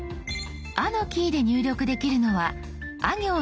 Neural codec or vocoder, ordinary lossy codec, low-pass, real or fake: none; none; none; real